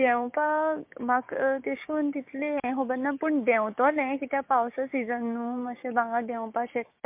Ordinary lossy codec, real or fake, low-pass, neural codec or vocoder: MP3, 32 kbps; fake; 3.6 kHz; codec, 16 kHz, 6 kbps, DAC